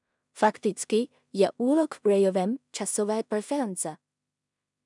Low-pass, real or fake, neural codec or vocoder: 10.8 kHz; fake; codec, 16 kHz in and 24 kHz out, 0.4 kbps, LongCat-Audio-Codec, two codebook decoder